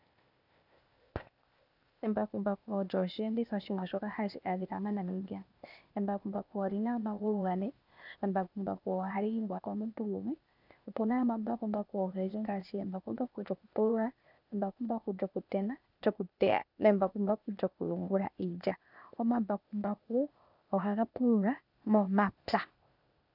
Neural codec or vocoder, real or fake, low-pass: codec, 16 kHz, 0.8 kbps, ZipCodec; fake; 5.4 kHz